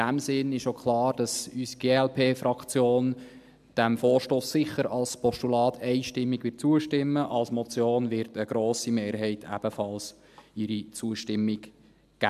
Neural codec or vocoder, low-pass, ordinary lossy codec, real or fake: none; 14.4 kHz; AAC, 96 kbps; real